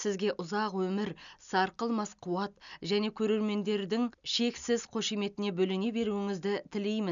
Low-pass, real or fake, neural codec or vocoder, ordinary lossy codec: 7.2 kHz; real; none; none